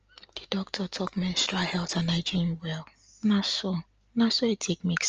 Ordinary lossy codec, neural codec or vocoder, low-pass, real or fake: Opus, 24 kbps; none; 7.2 kHz; real